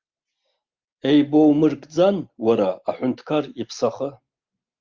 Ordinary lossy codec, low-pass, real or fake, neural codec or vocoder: Opus, 32 kbps; 7.2 kHz; real; none